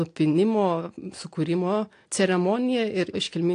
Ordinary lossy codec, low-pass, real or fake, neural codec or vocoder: AAC, 48 kbps; 9.9 kHz; real; none